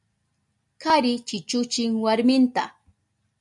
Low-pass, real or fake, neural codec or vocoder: 10.8 kHz; real; none